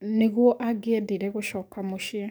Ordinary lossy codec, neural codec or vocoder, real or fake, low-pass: none; codec, 44.1 kHz, 7.8 kbps, DAC; fake; none